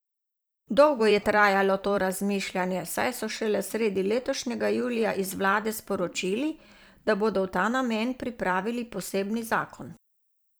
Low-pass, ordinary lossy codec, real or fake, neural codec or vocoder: none; none; fake; vocoder, 44.1 kHz, 128 mel bands every 512 samples, BigVGAN v2